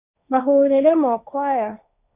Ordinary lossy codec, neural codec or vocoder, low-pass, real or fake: none; codec, 16 kHz, 1.1 kbps, Voila-Tokenizer; 3.6 kHz; fake